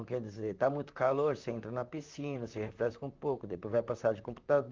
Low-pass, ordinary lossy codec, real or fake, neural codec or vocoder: 7.2 kHz; Opus, 24 kbps; fake; vocoder, 44.1 kHz, 128 mel bands, Pupu-Vocoder